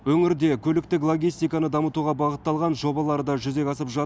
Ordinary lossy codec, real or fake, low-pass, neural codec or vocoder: none; real; none; none